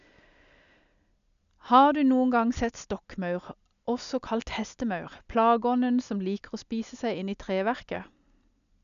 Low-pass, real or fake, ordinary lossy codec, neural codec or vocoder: 7.2 kHz; real; none; none